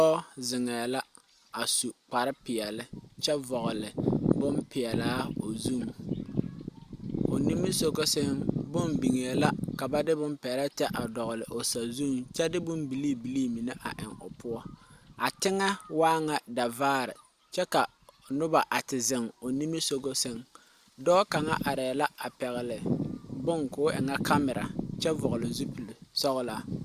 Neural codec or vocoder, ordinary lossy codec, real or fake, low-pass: none; Opus, 64 kbps; real; 14.4 kHz